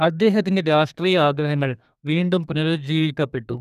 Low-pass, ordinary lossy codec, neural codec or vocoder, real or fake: 14.4 kHz; none; codec, 32 kHz, 1.9 kbps, SNAC; fake